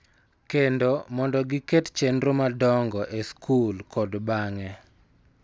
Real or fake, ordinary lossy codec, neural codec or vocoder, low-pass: real; none; none; none